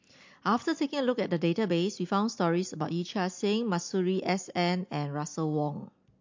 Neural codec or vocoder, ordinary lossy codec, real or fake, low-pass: none; MP3, 48 kbps; real; 7.2 kHz